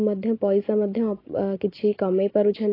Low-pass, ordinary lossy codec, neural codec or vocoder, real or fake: 5.4 kHz; AAC, 24 kbps; none; real